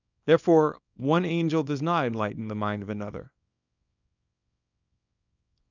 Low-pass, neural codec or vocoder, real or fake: 7.2 kHz; codec, 24 kHz, 0.9 kbps, WavTokenizer, small release; fake